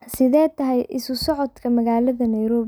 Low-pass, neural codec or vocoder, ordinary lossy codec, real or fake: none; none; none; real